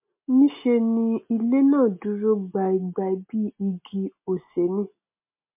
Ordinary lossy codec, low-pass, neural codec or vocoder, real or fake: MP3, 24 kbps; 3.6 kHz; none; real